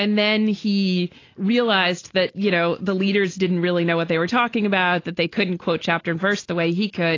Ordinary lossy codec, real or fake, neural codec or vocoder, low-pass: AAC, 32 kbps; real; none; 7.2 kHz